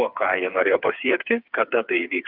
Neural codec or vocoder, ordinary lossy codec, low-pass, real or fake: codec, 16 kHz, 4 kbps, FreqCodec, smaller model; Opus, 24 kbps; 5.4 kHz; fake